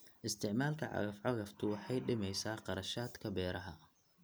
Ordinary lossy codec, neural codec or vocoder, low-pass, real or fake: none; none; none; real